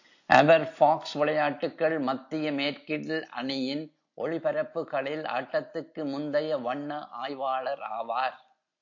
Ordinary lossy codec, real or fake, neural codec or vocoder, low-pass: AAC, 48 kbps; real; none; 7.2 kHz